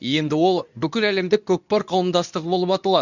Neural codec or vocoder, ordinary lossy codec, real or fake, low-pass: codec, 24 kHz, 0.9 kbps, WavTokenizer, medium speech release version 2; none; fake; 7.2 kHz